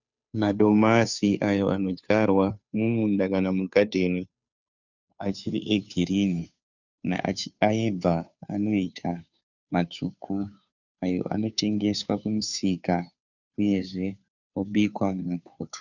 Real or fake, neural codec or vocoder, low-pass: fake; codec, 16 kHz, 2 kbps, FunCodec, trained on Chinese and English, 25 frames a second; 7.2 kHz